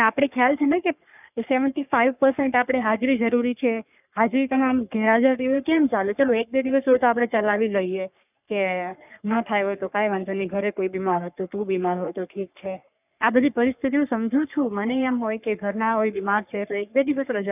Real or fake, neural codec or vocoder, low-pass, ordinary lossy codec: fake; codec, 44.1 kHz, 3.4 kbps, Pupu-Codec; 3.6 kHz; none